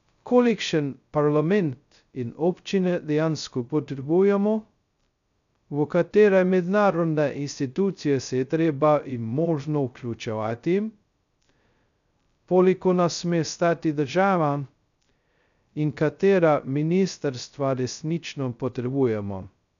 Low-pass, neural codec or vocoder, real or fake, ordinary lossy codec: 7.2 kHz; codec, 16 kHz, 0.2 kbps, FocalCodec; fake; none